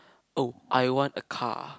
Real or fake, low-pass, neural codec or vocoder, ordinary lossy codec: real; none; none; none